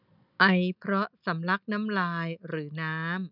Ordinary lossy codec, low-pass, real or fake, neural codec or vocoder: none; 5.4 kHz; real; none